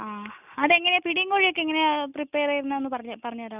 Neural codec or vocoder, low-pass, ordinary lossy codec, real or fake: none; 3.6 kHz; none; real